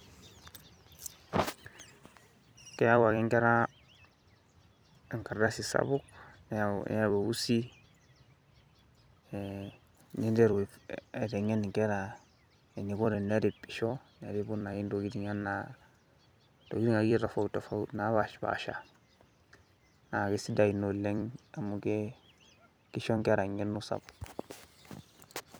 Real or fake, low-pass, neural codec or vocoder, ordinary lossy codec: fake; none; vocoder, 44.1 kHz, 128 mel bands every 256 samples, BigVGAN v2; none